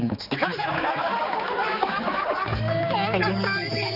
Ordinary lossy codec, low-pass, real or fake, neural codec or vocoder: none; 5.4 kHz; fake; codec, 16 kHz, 4 kbps, X-Codec, HuBERT features, trained on general audio